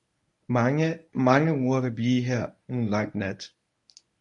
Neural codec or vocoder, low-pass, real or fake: codec, 24 kHz, 0.9 kbps, WavTokenizer, medium speech release version 1; 10.8 kHz; fake